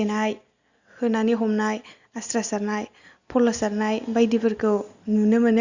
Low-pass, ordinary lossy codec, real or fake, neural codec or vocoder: 7.2 kHz; Opus, 64 kbps; real; none